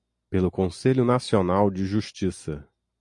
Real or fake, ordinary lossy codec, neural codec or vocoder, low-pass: real; MP3, 48 kbps; none; 10.8 kHz